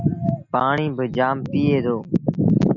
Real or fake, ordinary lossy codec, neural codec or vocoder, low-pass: real; AAC, 48 kbps; none; 7.2 kHz